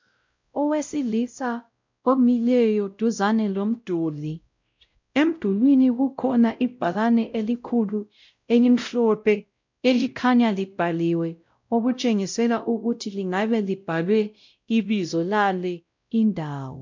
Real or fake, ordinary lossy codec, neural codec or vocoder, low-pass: fake; MP3, 64 kbps; codec, 16 kHz, 0.5 kbps, X-Codec, WavLM features, trained on Multilingual LibriSpeech; 7.2 kHz